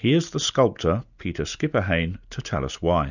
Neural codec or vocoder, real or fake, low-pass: none; real; 7.2 kHz